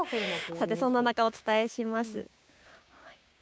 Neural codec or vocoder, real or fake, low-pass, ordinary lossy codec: codec, 16 kHz, 6 kbps, DAC; fake; none; none